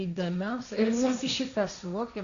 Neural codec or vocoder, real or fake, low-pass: codec, 16 kHz, 1.1 kbps, Voila-Tokenizer; fake; 7.2 kHz